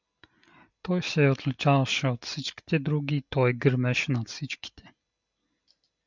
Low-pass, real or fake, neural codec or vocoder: 7.2 kHz; real; none